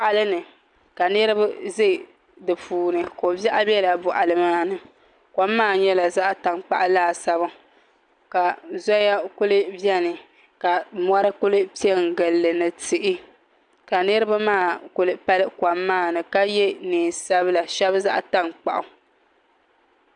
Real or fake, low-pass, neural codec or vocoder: real; 9.9 kHz; none